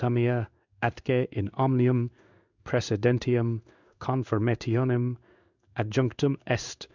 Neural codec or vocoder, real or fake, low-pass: codec, 16 kHz in and 24 kHz out, 1 kbps, XY-Tokenizer; fake; 7.2 kHz